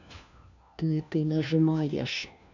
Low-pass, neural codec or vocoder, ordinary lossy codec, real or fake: 7.2 kHz; codec, 16 kHz, 1 kbps, FunCodec, trained on LibriTTS, 50 frames a second; none; fake